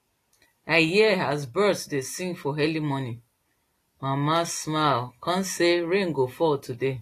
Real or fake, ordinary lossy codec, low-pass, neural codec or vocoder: real; AAC, 48 kbps; 14.4 kHz; none